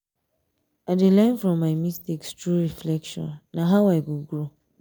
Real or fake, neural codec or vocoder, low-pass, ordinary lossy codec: real; none; none; none